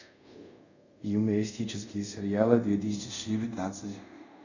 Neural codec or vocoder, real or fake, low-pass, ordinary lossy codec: codec, 24 kHz, 0.5 kbps, DualCodec; fake; 7.2 kHz; none